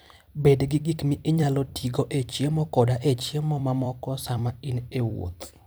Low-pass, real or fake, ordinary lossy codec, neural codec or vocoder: none; fake; none; vocoder, 44.1 kHz, 128 mel bands every 256 samples, BigVGAN v2